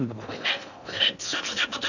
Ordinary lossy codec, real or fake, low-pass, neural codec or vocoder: none; fake; 7.2 kHz; codec, 16 kHz in and 24 kHz out, 0.6 kbps, FocalCodec, streaming, 4096 codes